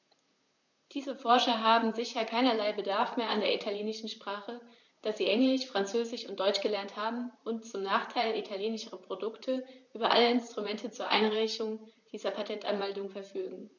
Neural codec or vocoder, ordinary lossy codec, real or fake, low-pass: vocoder, 44.1 kHz, 128 mel bands, Pupu-Vocoder; none; fake; 7.2 kHz